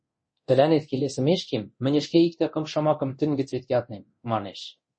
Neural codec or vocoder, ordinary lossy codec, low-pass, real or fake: codec, 24 kHz, 0.9 kbps, DualCodec; MP3, 32 kbps; 9.9 kHz; fake